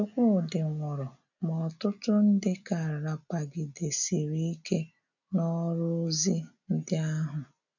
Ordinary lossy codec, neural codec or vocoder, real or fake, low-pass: none; none; real; 7.2 kHz